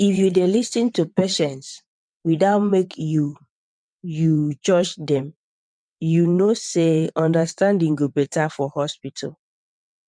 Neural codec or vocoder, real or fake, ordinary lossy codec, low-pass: vocoder, 22.05 kHz, 80 mel bands, WaveNeXt; fake; none; 9.9 kHz